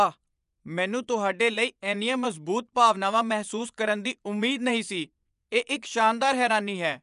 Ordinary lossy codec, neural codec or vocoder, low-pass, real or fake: none; vocoder, 24 kHz, 100 mel bands, Vocos; 10.8 kHz; fake